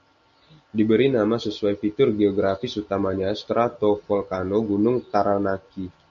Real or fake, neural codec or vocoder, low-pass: real; none; 7.2 kHz